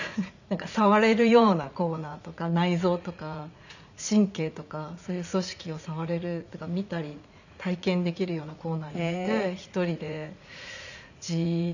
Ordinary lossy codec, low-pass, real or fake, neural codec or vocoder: none; 7.2 kHz; fake; vocoder, 44.1 kHz, 80 mel bands, Vocos